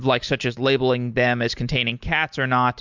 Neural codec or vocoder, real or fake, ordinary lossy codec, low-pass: none; real; MP3, 64 kbps; 7.2 kHz